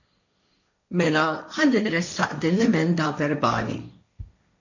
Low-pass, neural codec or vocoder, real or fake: 7.2 kHz; codec, 16 kHz, 1.1 kbps, Voila-Tokenizer; fake